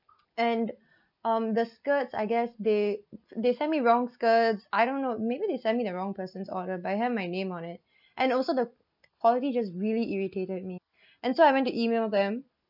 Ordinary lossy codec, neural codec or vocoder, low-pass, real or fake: none; none; 5.4 kHz; real